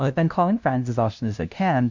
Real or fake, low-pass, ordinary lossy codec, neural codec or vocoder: fake; 7.2 kHz; MP3, 64 kbps; codec, 16 kHz, 0.5 kbps, FunCodec, trained on LibriTTS, 25 frames a second